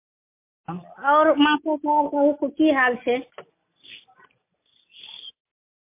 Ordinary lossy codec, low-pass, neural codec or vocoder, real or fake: MP3, 24 kbps; 3.6 kHz; none; real